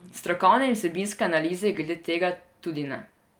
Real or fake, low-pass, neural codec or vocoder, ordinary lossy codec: real; 19.8 kHz; none; Opus, 32 kbps